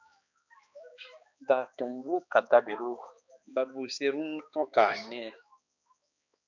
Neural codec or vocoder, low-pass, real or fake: codec, 16 kHz, 2 kbps, X-Codec, HuBERT features, trained on balanced general audio; 7.2 kHz; fake